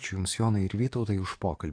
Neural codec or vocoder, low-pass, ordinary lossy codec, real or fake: codec, 44.1 kHz, 7.8 kbps, DAC; 9.9 kHz; AAC, 48 kbps; fake